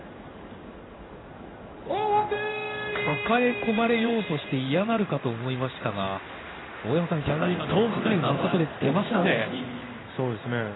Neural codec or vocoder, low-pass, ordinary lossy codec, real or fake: codec, 16 kHz in and 24 kHz out, 1 kbps, XY-Tokenizer; 7.2 kHz; AAC, 16 kbps; fake